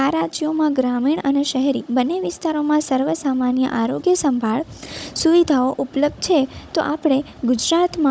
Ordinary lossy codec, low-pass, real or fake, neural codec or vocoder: none; none; fake; codec, 16 kHz, 16 kbps, FunCodec, trained on Chinese and English, 50 frames a second